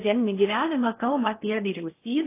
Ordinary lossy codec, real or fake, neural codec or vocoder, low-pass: AAC, 24 kbps; fake; codec, 16 kHz in and 24 kHz out, 0.6 kbps, FocalCodec, streaming, 4096 codes; 3.6 kHz